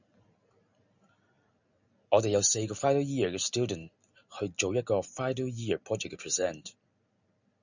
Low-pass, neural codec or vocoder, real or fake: 7.2 kHz; vocoder, 44.1 kHz, 128 mel bands every 256 samples, BigVGAN v2; fake